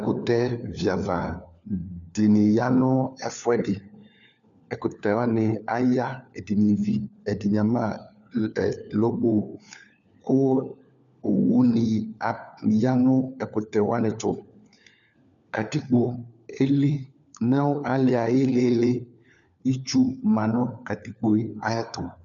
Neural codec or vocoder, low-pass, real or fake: codec, 16 kHz, 4 kbps, FunCodec, trained on LibriTTS, 50 frames a second; 7.2 kHz; fake